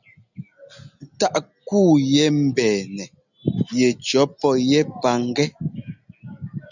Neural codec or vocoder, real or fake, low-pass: none; real; 7.2 kHz